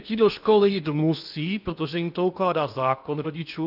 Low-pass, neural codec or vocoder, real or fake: 5.4 kHz; codec, 16 kHz in and 24 kHz out, 0.8 kbps, FocalCodec, streaming, 65536 codes; fake